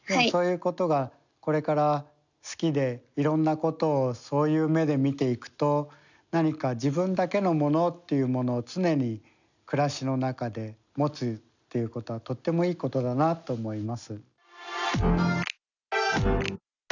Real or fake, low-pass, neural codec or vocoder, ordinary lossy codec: real; 7.2 kHz; none; none